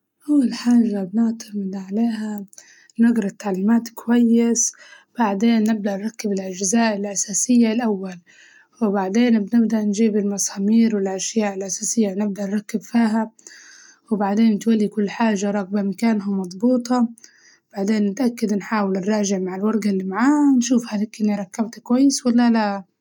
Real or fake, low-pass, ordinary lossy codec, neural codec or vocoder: real; 19.8 kHz; none; none